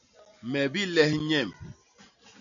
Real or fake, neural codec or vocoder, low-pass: real; none; 7.2 kHz